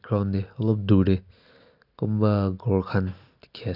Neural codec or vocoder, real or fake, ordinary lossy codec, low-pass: none; real; none; 5.4 kHz